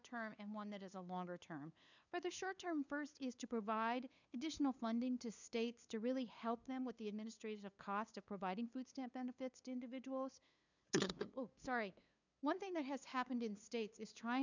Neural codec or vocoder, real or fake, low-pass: codec, 16 kHz, 2 kbps, FunCodec, trained on LibriTTS, 25 frames a second; fake; 7.2 kHz